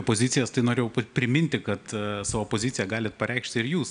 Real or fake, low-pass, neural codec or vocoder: real; 9.9 kHz; none